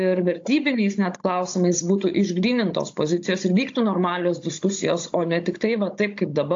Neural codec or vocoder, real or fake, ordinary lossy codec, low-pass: codec, 16 kHz, 16 kbps, FunCodec, trained on Chinese and English, 50 frames a second; fake; AAC, 48 kbps; 7.2 kHz